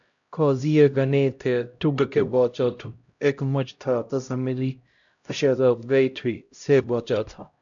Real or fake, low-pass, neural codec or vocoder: fake; 7.2 kHz; codec, 16 kHz, 0.5 kbps, X-Codec, HuBERT features, trained on LibriSpeech